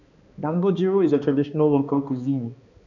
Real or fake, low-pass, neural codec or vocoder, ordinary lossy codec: fake; 7.2 kHz; codec, 16 kHz, 2 kbps, X-Codec, HuBERT features, trained on balanced general audio; none